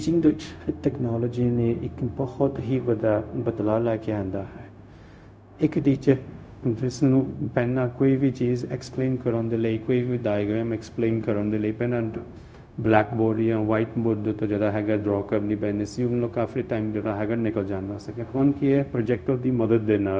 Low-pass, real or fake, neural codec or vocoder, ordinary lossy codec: none; fake; codec, 16 kHz, 0.4 kbps, LongCat-Audio-Codec; none